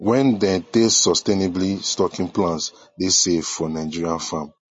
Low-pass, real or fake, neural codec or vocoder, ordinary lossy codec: 10.8 kHz; real; none; MP3, 32 kbps